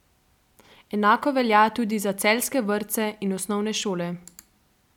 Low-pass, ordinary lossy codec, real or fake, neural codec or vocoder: 19.8 kHz; none; real; none